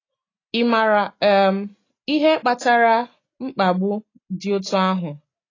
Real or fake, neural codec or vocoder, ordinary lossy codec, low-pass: real; none; AAC, 32 kbps; 7.2 kHz